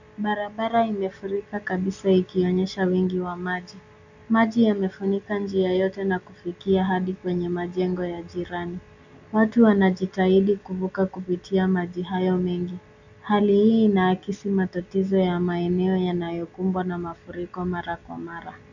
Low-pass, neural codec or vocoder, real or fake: 7.2 kHz; none; real